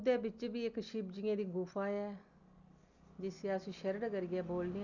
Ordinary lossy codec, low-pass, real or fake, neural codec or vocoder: none; 7.2 kHz; real; none